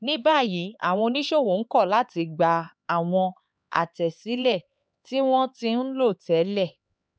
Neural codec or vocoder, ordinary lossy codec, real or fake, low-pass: codec, 16 kHz, 4 kbps, X-Codec, HuBERT features, trained on LibriSpeech; none; fake; none